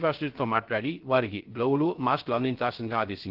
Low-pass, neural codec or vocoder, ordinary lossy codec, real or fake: 5.4 kHz; codec, 16 kHz, 0.3 kbps, FocalCodec; Opus, 16 kbps; fake